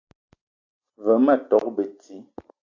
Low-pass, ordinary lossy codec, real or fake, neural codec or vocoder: 7.2 kHz; AAC, 48 kbps; real; none